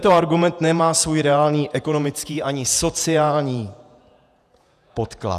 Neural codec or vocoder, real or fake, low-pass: vocoder, 48 kHz, 128 mel bands, Vocos; fake; 14.4 kHz